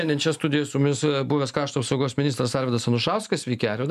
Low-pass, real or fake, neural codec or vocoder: 14.4 kHz; real; none